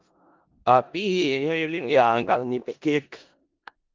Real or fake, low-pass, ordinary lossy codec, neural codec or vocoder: fake; 7.2 kHz; Opus, 32 kbps; codec, 16 kHz in and 24 kHz out, 0.4 kbps, LongCat-Audio-Codec, four codebook decoder